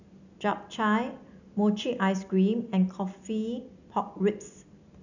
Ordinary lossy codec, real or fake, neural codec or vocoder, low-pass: none; real; none; 7.2 kHz